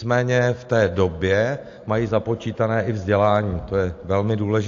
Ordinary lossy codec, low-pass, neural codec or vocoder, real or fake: AAC, 48 kbps; 7.2 kHz; none; real